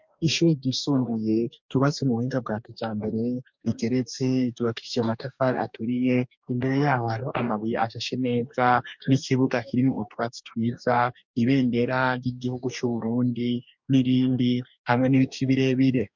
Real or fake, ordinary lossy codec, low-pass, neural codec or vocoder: fake; MP3, 64 kbps; 7.2 kHz; codec, 44.1 kHz, 2.6 kbps, DAC